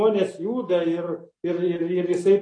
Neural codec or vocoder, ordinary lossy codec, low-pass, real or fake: none; AAC, 32 kbps; 9.9 kHz; real